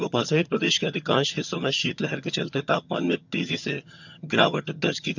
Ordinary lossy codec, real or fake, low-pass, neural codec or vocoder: none; fake; 7.2 kHz; vocoder, 22.05 kHz, 80 mel bands, HiFi-GAN